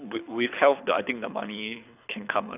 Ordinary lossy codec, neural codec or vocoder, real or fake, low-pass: none; codec, 24 kHz, 6 kbps, HILCodec; fake; 3.6 kHz